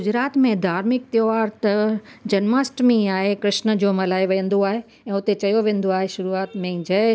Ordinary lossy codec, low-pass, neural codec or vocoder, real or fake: none; none; none; real